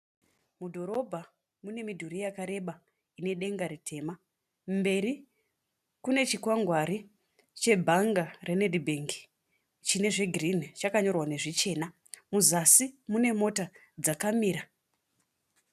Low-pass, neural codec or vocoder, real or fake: 14.4 kHz; none; real